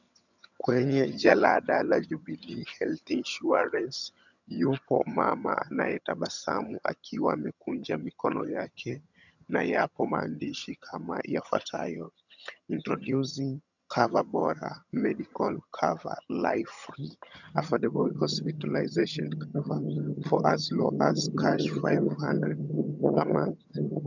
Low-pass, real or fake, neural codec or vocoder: 7.2 kHz; fake; vocoder, 22.05 kHz, 80 mel bands, HiFi-GAN